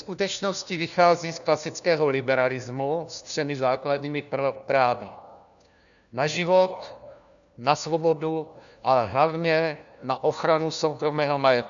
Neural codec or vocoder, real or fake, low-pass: codec, 16 kHz, 1 kbps, FunCodec, trained on LibriTTS, 50 frames a second; fake; 7.2 kHz